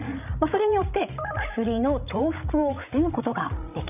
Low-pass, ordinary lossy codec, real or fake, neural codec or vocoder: 3.6 kHz; none; fake; codec, 16 kHz, 16 kbps, FreqCodec, larger model